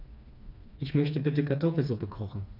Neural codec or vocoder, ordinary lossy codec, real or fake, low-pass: codec, 16 kHz, 2 kbps, FreqCodec, smaller model; none; fake; 5.4 kHz